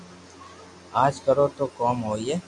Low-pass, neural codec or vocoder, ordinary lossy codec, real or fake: 10.8 kHz; none; AAC, 64 kbps; real